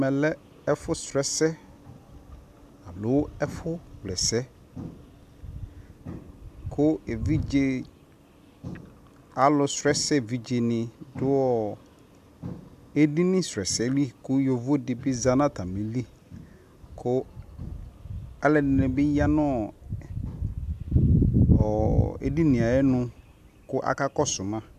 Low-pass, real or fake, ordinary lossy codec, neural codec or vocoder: 14.4 kHz; real; AAC, 96 kbps; none